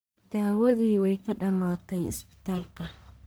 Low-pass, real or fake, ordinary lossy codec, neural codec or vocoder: none; fake; none; codec, 44.1 kHz, 1.7 kbps, Pupu-Codec